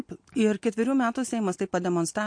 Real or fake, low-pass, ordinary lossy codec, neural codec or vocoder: real; 9.9 kHz; MP3, 48 kbps; none